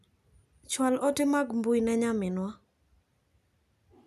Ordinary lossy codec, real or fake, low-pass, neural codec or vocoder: none; real; 14.4 kHz; none